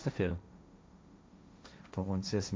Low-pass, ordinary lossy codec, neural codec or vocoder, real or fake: none; none; codec, 16 kHz, 1.1 kbps, Voila-Tokenizer; fake